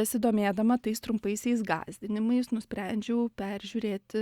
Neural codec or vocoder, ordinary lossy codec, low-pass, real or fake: none; Opus, 64 kbps; 19.8 kHz; real